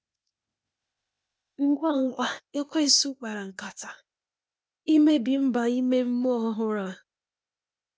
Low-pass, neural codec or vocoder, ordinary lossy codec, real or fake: none; codec, 16 kHz, 0.8 kbps, ZipCodec; none; fake